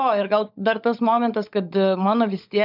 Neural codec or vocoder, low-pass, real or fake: codec, 16 kHz, 16 kbps, FunCodec, trained on LibriTTS, 50 frames a second; 5.4 kHz; fake